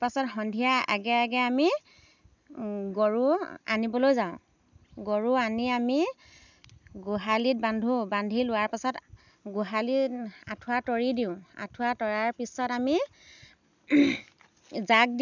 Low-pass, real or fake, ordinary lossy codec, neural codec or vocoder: 7.2 kHz; real; none; none